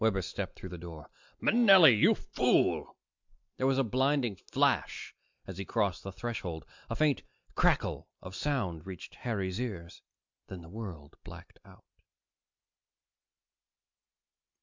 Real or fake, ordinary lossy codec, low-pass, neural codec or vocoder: real; MP3, 64 kbps; 7.2 kHz; none